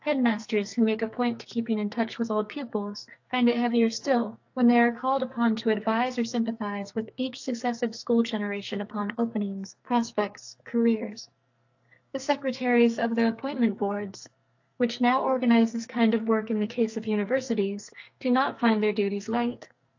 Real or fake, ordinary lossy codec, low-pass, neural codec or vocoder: fake; AAC, 48 kbps; 7.2 kHz; codec, 44.1 kHz, 2.6 kbps, SNAC